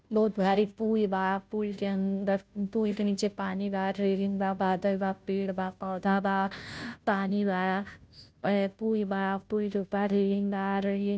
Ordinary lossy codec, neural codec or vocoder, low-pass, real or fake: none; codec, 16 kHz, 0.5 kbps, FunCodec, trained on Chinese and English, 25 frames a second; none; fake